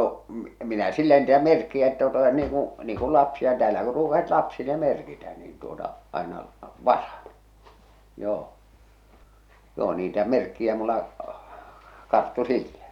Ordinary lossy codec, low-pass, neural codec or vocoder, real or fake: none; 19.8 kHz; none; real